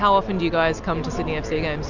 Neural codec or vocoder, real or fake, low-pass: none; real; 7.2 kHz